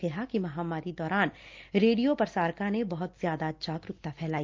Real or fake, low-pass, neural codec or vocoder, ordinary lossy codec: real; 7.2 kHz; none; Opus, 32 kbps